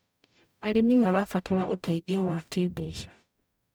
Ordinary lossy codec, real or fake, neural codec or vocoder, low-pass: none; fake; codec, 44.1 kHz, 0.9 kbps, DAC; none